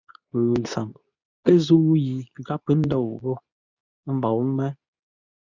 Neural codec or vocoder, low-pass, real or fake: codec, 24 kHz, 0.9 kbps, WavTokenizer, medium speech release version 2; 7.2 kHz; fake